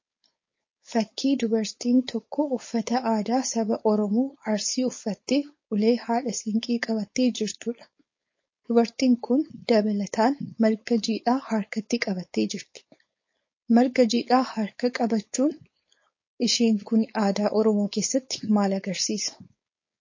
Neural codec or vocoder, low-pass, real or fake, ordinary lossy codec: codec, 16 kHz, 4.8 kbps, FACodec; 7.2 kHz; fake; MP3, 32 kbps